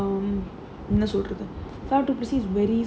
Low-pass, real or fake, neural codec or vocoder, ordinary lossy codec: none; real; none; none